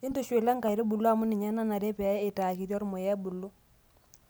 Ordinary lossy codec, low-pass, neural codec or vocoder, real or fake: none; none; none; real